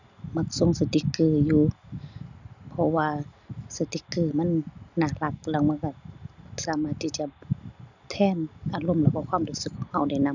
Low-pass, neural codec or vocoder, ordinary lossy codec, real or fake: 7.2 kHz; none; none; real